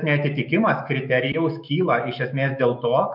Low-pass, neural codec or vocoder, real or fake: 5.4 kHz; autoencoder, 48 kHz, 128 numbers a frame, DAC-VAE, trained on Japanese speech; fake